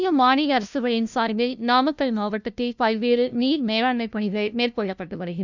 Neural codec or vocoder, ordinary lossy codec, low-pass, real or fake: codec, 16 kHz, 0.5 kbps, FunCodec, trained on LibriTTS, 25 frames a second; none; 7.2 kHz; fake